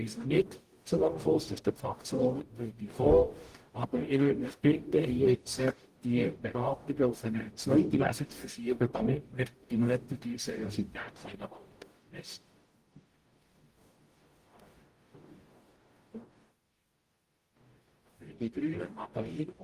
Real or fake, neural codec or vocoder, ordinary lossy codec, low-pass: fake; codec, 44.1 kHz, 0.9 kbps, DAC; Opus, 24 kbps; 14.4 kHz